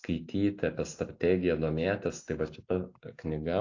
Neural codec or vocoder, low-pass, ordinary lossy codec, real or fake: none; 7.2 kHz; AAC, 48 kbps; real